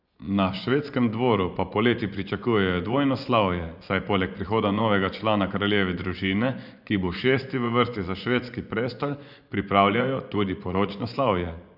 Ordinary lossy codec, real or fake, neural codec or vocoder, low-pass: none; fake; vocoder, 24 kHz, 100 mel bands, Vocos; 5.4 kHz